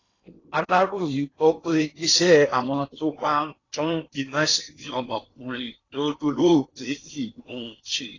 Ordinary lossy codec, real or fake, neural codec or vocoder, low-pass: AAC, 32 kbps; fake; codec, 16 kHz in and 24 kHz out, 0.8 kbps, FocalCodec, streaming, 65536 codes; 7.2 kHz